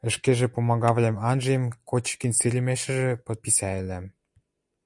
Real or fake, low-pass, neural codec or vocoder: real; 10.8 kHz; none